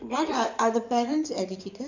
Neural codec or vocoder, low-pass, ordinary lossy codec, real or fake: codec, 16 kHz in and 24 kHz out, 2.2 kbps, FireRedTTS-2 codec; 7.2 kHz; none; fake